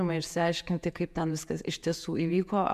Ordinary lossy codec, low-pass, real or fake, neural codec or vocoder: MP3, 96 kbps; 14.4 kHz; fake; vocoder, 48 kHz, 128 mel bands, Vocos